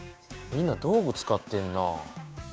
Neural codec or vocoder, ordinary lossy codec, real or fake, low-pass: codec, 16 kHz, 6 kbps, DAC; none; fake; none